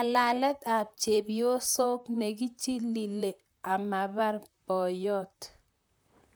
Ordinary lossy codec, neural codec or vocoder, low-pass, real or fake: none; vocoder, 44.1 kHz, 128 mel bands, Pupu-Vocoder; none; fake